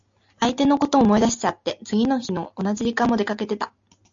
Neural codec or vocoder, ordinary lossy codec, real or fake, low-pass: none; AAC, 64 kbps; real; 7.2 kHz